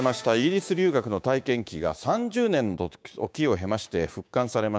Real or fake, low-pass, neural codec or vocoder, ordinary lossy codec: fake; none; codec, 16 kHz, 6 kbps, DAC; none